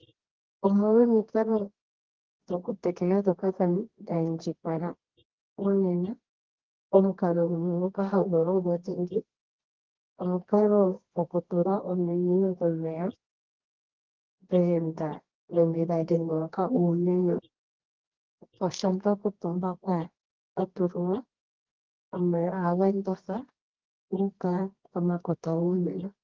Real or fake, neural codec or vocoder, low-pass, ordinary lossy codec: fake; codec, 24 kHz, 0.9 kbps, WavTokenizer, medium music audio release; 7.2 kHz; Opus, 16 kbps